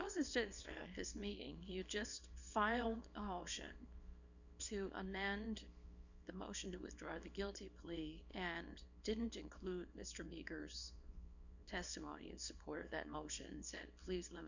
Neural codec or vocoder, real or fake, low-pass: codec, 24 kHz, 0.9 kbps, WavTokenizer, small release; fake; 7.2 kHz